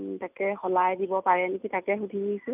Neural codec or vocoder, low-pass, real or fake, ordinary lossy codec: none; 3.6 kHz; real; none